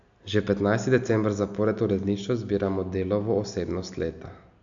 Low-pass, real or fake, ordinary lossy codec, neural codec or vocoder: 7.2 kHz; real; AAC, 64 kbps; none